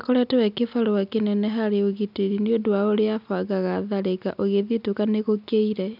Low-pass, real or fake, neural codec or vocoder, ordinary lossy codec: 5.4 kHz; real; none; none